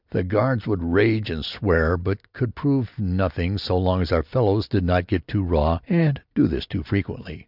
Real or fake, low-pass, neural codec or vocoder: real; 5.4 kHz; none